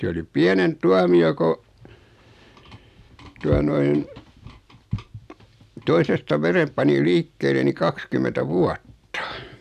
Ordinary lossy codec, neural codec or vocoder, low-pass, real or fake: none; none; 14.4 kHz; real